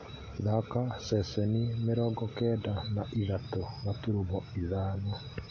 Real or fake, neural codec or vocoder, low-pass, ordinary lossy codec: real; none; 7.2 kHz; AAC, 48 kbps